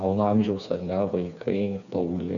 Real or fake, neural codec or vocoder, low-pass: fake; codec, 16 kHz, 4 kbps, FreqCodec, smaller model; 7.2 kHz